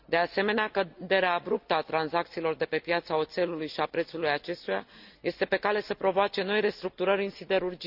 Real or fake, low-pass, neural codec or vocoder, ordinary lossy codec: real; 5.4 kHz; none; none